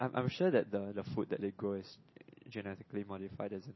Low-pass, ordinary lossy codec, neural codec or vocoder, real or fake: 7.2 kHz; MP3, 24 kbps; none; real